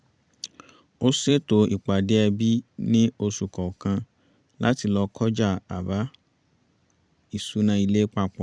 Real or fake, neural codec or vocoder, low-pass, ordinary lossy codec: fake; vocoder, 44.1 kHz, 128 mel bands every 256 samples, BigVGAN v2; 9.9 kHz; none